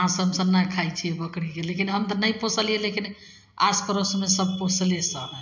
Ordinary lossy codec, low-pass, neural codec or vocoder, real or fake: MP3, 64 kbps; 7.2 kHz; none; real